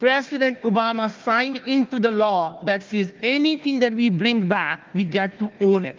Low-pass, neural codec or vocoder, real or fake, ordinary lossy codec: 7.2 kHz; codec, 16 kHz, 1 kbps, FunCodec, trained on Chinese and English, 50 frames a second; fake; Opus, 32 kbps